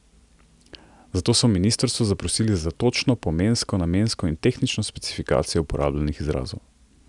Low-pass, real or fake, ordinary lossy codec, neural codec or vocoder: 10.8 kHz; real; none; none